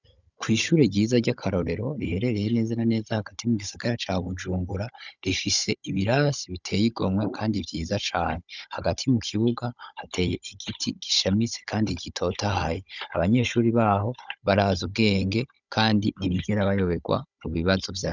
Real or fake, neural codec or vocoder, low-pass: fake; codec, 16 kHz, 16 kbps, FunCodec, trained on Chinese and English, 50 frames a second; 7.2 kHz